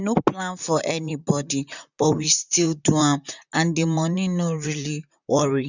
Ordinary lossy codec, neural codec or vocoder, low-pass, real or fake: none; vocoder, 44.1 kHz, 128 mel bands, Pupu-Vocoder; 7.2 kHz; fake